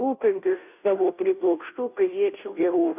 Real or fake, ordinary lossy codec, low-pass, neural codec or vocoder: fake; AAC, 32 kbps; 3.6 kHz; codec, 16 kHz, 0.5 kbps, FunCodec, trained on Chinese and English, 25 frames a second